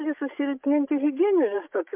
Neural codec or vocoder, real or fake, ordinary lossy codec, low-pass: codec, 16 kHz, 16 kbps, FreqCodec, larger model; fake; MP3, 32 kbps; 3.6 kHz